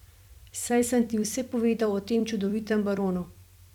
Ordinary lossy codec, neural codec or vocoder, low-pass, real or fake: none; vocoder, 44.1 kHz, 128 mel bands every 256 samples, BigVGAN v2; 19.8 kHz; fake